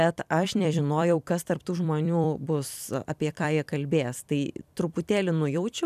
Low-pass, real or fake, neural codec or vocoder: 14.4 kHz; fake; vocoder, 44.1 kHz, 128 mel bands every 256 samples, BigVGAN v2